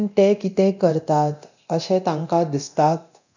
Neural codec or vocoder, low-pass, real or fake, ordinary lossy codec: codec, 24 kHz, 0.9 kbps, DualCodec; 7.2 kHz; fake; none